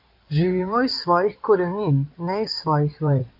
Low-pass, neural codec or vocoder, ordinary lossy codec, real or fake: 5.4 kHz; codec, 16 kHz in and 24 kHz out, 2.2 kbps, FireRedTTS-2 codec; MP3, 48 kbps; fake